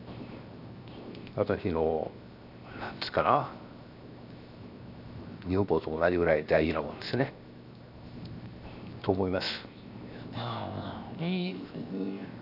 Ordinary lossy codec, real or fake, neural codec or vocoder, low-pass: none; fake; codec, 16 kHz, 0.7 kbps, FocalCodec; 5.4 kHz